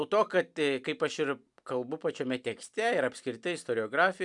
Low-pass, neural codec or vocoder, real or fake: 10.8 kHz; none; real